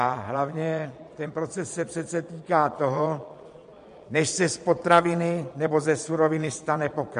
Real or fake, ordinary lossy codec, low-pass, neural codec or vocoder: fake; MP3, 48 kbps; 9.9 kHz; vocoder, 22.05 kHz, 80 mel bands, Vocos